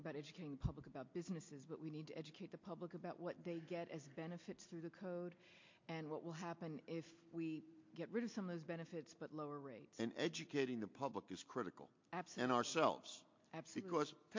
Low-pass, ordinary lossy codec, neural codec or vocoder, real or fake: 7.2 kHz; MP3, 48 kbps; none; real